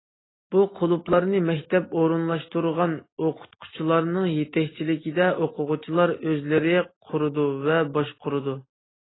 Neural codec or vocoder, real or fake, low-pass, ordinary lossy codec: none; real; 7.2 kHz; AAC, 16 kbps